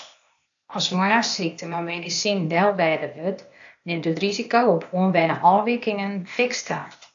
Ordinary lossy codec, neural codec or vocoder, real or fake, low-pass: AAC, 64 kbps; codec, 16 kHz, 0.8 kbps, ZipCodec; fake; 7.2 kHz